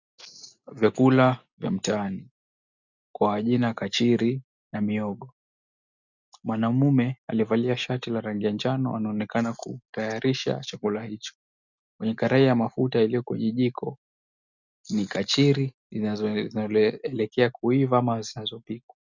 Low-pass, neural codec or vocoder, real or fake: 7.2 kHz; none; real